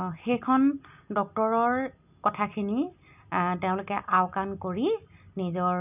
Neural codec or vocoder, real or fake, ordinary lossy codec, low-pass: none; real; none; 3.6 kHz